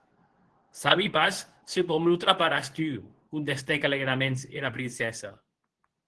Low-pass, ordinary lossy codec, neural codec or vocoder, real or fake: 10.8 kHz; Opus, 16 kbps; codec, 24 kHz, 0.9 kbps, WavTokenizer, medium speech release version 2; fake